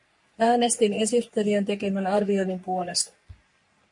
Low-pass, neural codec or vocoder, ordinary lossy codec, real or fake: 10.8 kHz; codec, 44.1 kHz, 3.4 kbps, Pupu-Codec; MP3, 48 kbps; fake